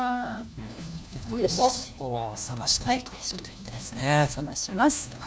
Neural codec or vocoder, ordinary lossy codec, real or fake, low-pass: codec, 16 kHz, 1 kbps, FunCodec, trained on LibriTTS, 50 frames a second; none; fake; none